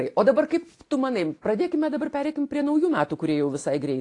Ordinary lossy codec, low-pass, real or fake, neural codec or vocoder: AAC, 48 kbps; 10.8 kHz; real; none